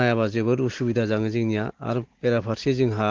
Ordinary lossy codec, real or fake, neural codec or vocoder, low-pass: Opus, 16 kbps; real; none; 7.2 kHz